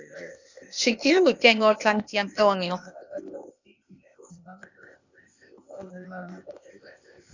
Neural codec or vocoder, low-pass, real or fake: codec, 16 kHz, 0.8 kbps, ZipCodec; 7.2 kHz; fake